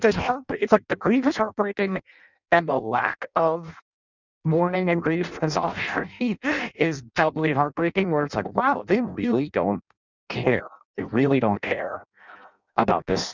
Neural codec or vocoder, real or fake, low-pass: codec, 16 kHz in and 24 kHz out, 0.6 kbps, FireRedTTS-2 codec; fake; 7.2 kHz